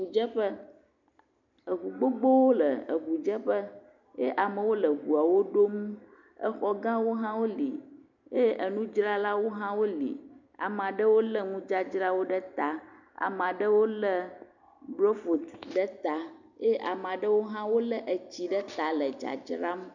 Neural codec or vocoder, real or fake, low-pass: none; real; 7.2 kHz